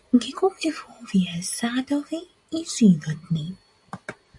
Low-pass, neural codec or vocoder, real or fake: 10.8 kHz; none; real